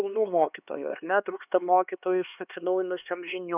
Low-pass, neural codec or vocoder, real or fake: 3.6 kHz; codec, 16 kHz, 2 kbps, X-Codec, HuBERT features, trained on LibriSpeech; fake